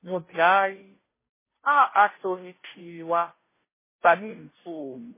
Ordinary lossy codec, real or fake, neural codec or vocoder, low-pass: MP3, 16 kbps; fake; codec, 16 kHz, 0.5 kbps, FunCodec, trained on Chinese and English, 25 frames a second; 3.6 kHz